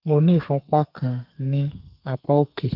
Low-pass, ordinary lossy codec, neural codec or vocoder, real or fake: 5.4 kHz; Opus, 32 kbps; codec, 32 kHz, 1.9 kbps, SNAC; fake